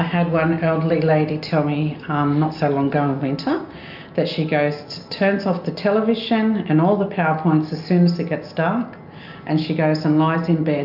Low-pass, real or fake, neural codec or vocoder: 5.4 kHz; real; none